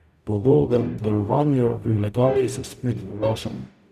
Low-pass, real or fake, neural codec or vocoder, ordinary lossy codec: 14.4 kHz; fake; codec, 44.1 kHz, 0.9 kbps, DAC; none